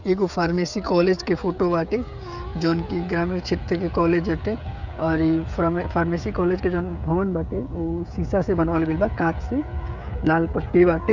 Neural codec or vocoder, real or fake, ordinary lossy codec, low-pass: codec, 16 kHz, 16 kbps, FreqCodec, smaller model; fake; none; 7.2 kHz